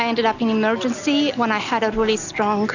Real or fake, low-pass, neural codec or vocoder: real; 7.2 kHz; none